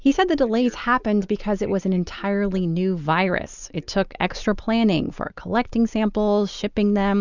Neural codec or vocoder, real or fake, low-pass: none; real; 7.2 kHz